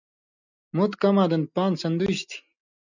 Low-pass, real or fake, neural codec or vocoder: 7.2 kHz; real; none